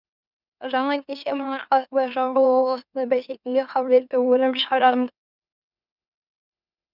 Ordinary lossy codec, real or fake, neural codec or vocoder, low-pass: none; fake; autoencoder, 44.1 kHz, a latent of 192 numbers a frame, MeloTTS; 5.4 kHz